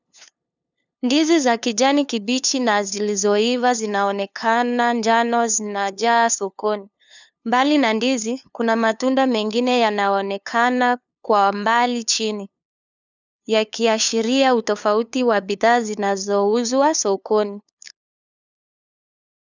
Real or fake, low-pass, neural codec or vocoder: fake; 7.2 kHz; codec, 16 kHz, 2 kbps, FunCodec, trained on LibriTTS, 25 frames a second